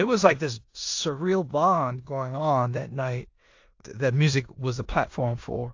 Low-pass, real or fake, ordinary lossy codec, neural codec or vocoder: 7.2 kHz; fake; AAC, 48 kbps; codec, 16 kHz in and 24 kHz out, 0.9 kbps, LongCat-Audio-Codec, four codebook decoder